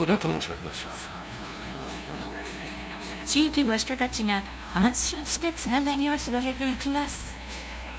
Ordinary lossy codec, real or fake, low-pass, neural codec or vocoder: none; fake; none; codec, 16 kHz, 0.5 kbps, FunCodec, trained on LibriTTS, 25 frames a second